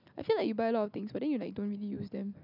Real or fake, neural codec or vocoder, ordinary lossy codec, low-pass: real; none; none; 5.4 kHz